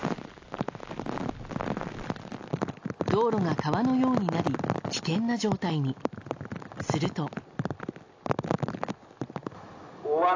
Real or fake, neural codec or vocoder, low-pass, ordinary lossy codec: real; none; 7.2 kHz; AAC, 48 kbps